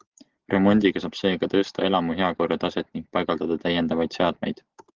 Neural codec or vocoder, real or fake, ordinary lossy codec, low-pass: none; real; Opus, 16 kbps; 7.2 kHz